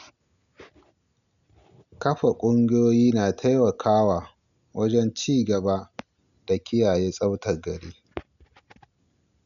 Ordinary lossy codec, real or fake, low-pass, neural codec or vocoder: none; real; 7.2 kHz; none